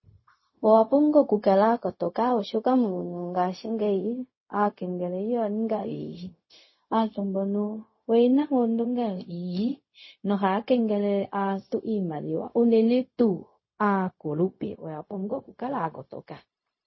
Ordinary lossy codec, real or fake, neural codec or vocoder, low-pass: MP3, 24 kbps; fake; codec, 16 kHz, 0.4 kbps, LongCat-Audio-Codec; 7.2 kHz